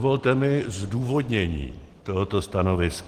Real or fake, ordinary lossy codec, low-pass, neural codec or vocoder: real; Opus, 16 kbps; 14.4 kHz; none